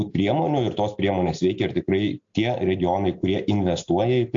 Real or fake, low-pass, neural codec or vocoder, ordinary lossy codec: real; 7.2 kHz; none; AAC, 64 kbps